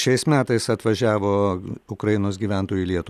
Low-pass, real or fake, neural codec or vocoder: 14.4 kHz; real; none